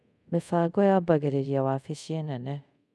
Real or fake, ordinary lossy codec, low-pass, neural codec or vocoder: fake; none; none; codec, 24 kHz, 0.5 kbps, DualCodec